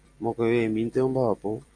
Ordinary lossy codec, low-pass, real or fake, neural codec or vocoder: AAC, 48 kbps; 9.9 kHz; real; none